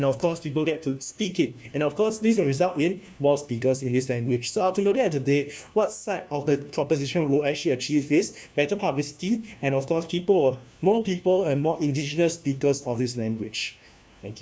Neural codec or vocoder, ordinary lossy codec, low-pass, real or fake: codec, 16 kHz, 1 kbps, FunCodec, trained on LibriTTS, 50 frames a second; none; none; fake